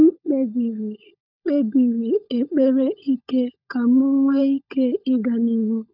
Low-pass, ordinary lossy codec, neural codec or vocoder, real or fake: 5.4 kHz; none; codec, 16 kHz, 16 kbps, FunCodec, trained on LibriTTS, 50 frames a second; fake